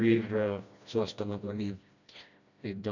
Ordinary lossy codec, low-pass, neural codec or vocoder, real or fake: none; 7.2 kHz; codec, 16 kHz, 1 kbps, FreqCodec, smaller model; fake